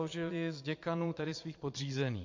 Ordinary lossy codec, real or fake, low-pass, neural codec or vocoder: MP3, 48 kbps; fake; 7.2 kHz; vocoder, 22.05 kHz, 80 mel bands, Vocos